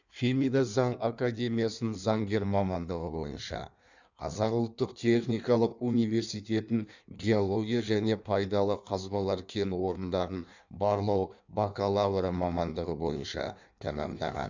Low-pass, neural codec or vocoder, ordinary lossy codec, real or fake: 7.2 kHz; codec, 16 kHz in and 24 kHz out, 1.1 kbps, FireRedTTS-2 codec; none; fake